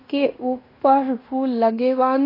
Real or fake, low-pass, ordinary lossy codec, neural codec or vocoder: fake; 5.4 kHz; AAC, 24 kbps; codec, 16 kHz in and 24 kHz out, 0.9 kbps, LongCat-Audio-Codec, fine tuned four codebook decoder